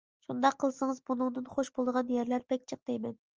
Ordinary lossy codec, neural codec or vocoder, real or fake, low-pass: Opus, 24 kbps; none; real; 7.2 kHz